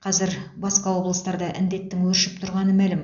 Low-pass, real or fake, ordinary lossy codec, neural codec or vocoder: 7.2 kHz; real; none; none